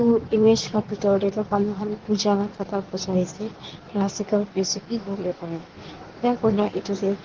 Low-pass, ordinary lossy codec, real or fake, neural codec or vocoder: 7.2 kHz; Opus, 16 kbps; fake; codec, 16 kHz in and 24 kHz out, 1.1 kbps, FireRedTTS-2 codec